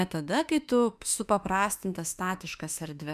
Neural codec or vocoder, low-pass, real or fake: autoencoder, 48 kHz, 32 numbers a frame, DAC-VAE, trained on Japanese speech; 14.4 kHz; fake